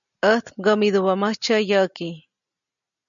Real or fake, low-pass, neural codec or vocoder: real; 7.2 kHz; none